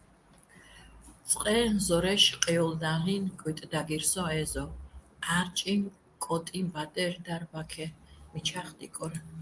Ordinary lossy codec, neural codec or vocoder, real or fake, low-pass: Opus, 32 kbps; none; real; 10.8 kHz